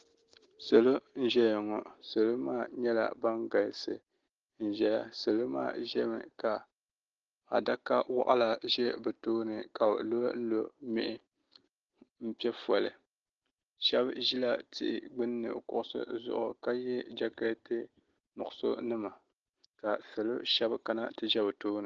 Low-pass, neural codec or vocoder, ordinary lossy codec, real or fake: 7.2 kHz; none; Opus, 16 kbps; real